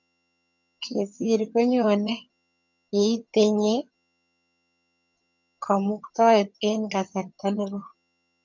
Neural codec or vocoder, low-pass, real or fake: vocoder, 22.05 kHz, 80 mel bands, HiFi-GAN; 7.2 kHz; fake